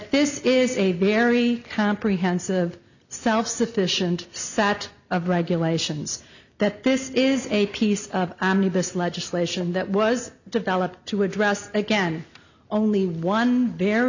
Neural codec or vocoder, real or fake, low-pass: none; real; 7.2 kHz